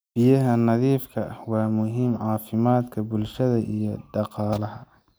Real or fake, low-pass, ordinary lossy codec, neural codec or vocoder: real; none; none; none